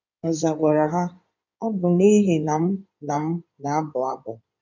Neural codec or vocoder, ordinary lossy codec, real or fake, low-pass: codec, 16 kHz in and 24 kHz out, 2.2 kbps, FireRedTTS-2 codec; none; fake; 7.2 kHz